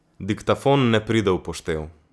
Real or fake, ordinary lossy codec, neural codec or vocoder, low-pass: real; none; none; none